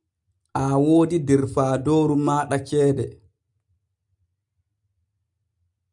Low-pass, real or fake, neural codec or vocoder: 10.8 kHz; real; none